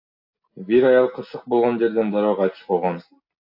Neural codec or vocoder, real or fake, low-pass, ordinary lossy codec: none; real; 5.4 kHz; AAC, 48 kbps